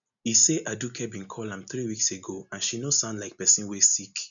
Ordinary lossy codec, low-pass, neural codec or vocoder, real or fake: none; 7.2 kHz; none; real